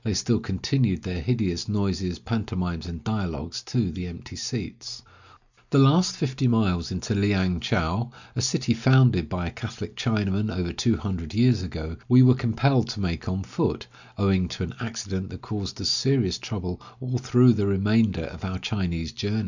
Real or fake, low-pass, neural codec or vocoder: real; 7.2 kHz; none